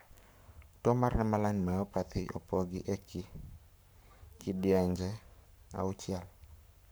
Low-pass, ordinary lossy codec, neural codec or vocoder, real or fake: none; none; codec, 44.1 kHz, 7.8 kbps, Pupu-Codec; fake